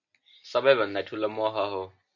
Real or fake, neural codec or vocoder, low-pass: real; none; 7.2 kHz